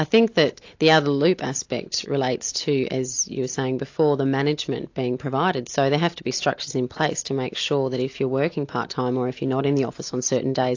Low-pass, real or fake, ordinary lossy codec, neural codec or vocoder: 7.2 kHz; real; AAC, 48 kbps; none